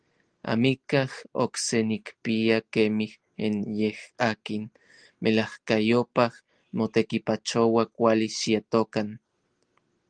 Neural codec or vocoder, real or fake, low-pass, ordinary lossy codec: none; real; 9.9 kHz; Opus, 24 kbps